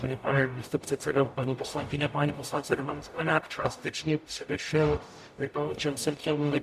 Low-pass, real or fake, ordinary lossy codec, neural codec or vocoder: 14.4 kHz; fake; MP3, 96 kbps; codec, 44.1 kHz, 0.9 kbps, DAC